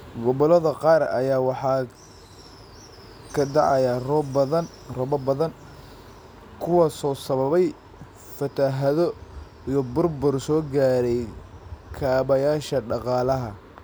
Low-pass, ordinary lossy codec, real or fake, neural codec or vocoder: none; none; real; none